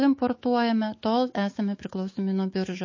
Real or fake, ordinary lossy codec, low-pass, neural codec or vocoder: fake; MP3, 32 kbps; 7.2 kHz; autoencoder, 48 kHz, 128 numbers a frame, DAC-VAE, trained on Japanese speech